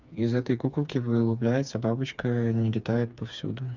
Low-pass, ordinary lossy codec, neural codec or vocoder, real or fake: 7.2 kHz; none; codec, 16 kHz, 4 kbps, FreqCodec, smaller model; fake